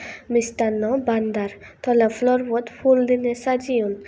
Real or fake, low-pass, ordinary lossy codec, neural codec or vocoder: real; none; none; none